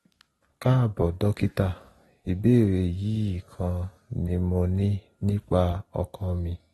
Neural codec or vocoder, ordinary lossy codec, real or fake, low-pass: none; AAC, 32 kbps; real; 19.8 kHz